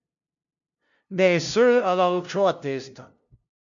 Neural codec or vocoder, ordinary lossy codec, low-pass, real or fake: codec, 16 kHz, 0.5 kbps, FunCodec, trained on LibriTTS, 25 frames a second; MP3, 96 kbps; 7.2 kHz; fake